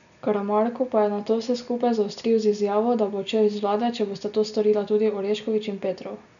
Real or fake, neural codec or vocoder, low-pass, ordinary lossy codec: real; none; 7.2 kHz; none